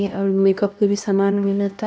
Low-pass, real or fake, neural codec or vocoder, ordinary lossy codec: none; fake; codec, 16 kHz, 1 kbps, X-Codec, HuBERT features, trained on LibriSpeech; none